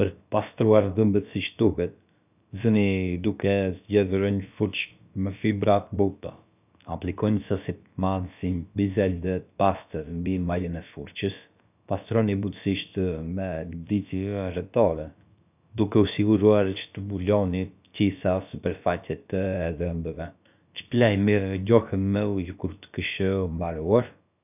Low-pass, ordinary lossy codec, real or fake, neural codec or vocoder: 3.6 kHz; none; fake; codec, 16 kHz, about 1 kbps, DyCAST, with the encoder's durations